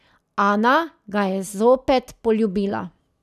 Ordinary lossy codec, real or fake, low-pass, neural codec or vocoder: none; real; 14.4 kHz; none